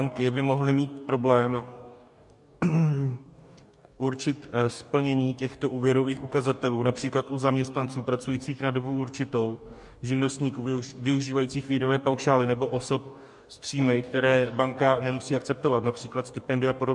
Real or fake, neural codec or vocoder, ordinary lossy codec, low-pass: fake; codec, 44.1 kHz, 2.6 kbps, DAC; MP3, 64 kbps; 10.8 kHz